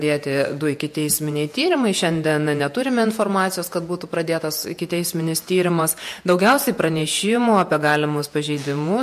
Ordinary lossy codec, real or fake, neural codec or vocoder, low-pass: MP3, 64 kbps; fake; vocoder, 48 kHz, 128 mel bands, Vocos; 14.4 kHz